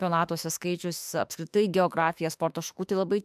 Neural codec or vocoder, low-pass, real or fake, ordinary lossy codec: autoencoder, 48 kHz, 32 numbers a frame, DAC-VAE, trained on Japanese speech; 14.4 kHz; fake; AAC, 96 kbps